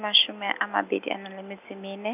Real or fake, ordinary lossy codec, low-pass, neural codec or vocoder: real; none; 3.6 kHz; none